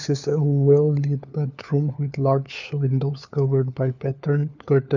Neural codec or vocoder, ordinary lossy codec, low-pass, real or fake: codec, 16 kHz, 4 kbps, FunCodec, trained on LibriTTS, 50 frames a second; none; 7.2 kHz; fake